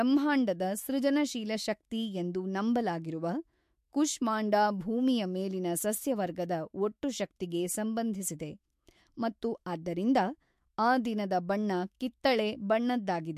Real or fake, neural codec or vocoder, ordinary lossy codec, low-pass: fake; autoencoder, 48 kHz, 128 numbers a frame, DAC-VAE, trained on Japanese speech; MP3, 64 kbps; 14.4 kHz